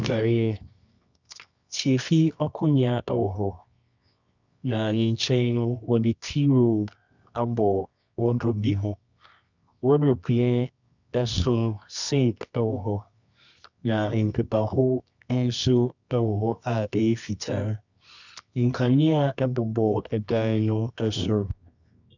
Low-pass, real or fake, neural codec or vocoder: 7.2 kHz; fake; codec, 24 kHz, 0.9 kbps, WavTokenizer, medium music audio release